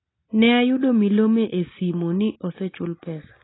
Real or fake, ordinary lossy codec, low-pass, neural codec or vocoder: real; AAC, 16 kbps; 7.2 kHz; none